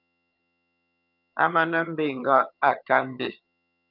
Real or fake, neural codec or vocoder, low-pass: fake; vocoder, 22.05 kHz, 80 mel bands, HiFi-GAN; 5.4 kHz